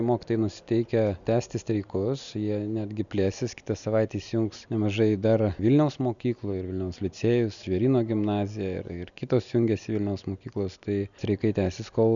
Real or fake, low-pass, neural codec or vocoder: real; 7.2 kHz; none